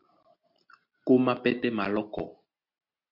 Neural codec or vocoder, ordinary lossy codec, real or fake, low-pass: none; MP3, 48 kbps; real; 5.4 kHz